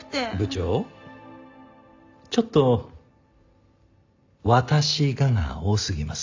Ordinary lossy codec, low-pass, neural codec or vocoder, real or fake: AAC, 48 kbps; 7.2 kHz; none; real